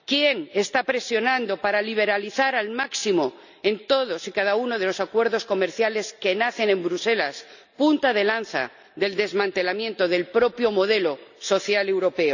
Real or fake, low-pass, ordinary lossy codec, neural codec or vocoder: real; 7.2 kHz; none; none